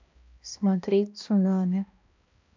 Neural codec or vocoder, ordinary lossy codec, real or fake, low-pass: codec, 16 kHz, 1 kbps, X-Codec, HuBERT features, trained on balanced general audio; AAC, 48 kbps; fake; 7.2 kHz